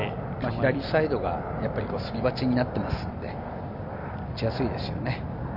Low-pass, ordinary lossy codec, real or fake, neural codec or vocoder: 5.4 kHz; none; real; none